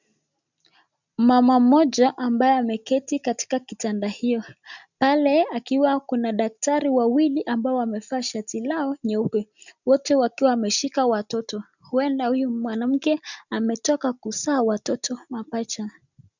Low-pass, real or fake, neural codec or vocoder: 7.2 kHz; real; none